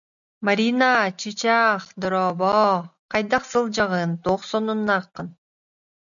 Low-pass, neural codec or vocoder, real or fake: 7.2 kHz; none; real